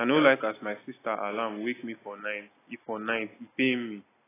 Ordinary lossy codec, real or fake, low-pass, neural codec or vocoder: AAC, 16 kbps; real; 3.6 kHz; none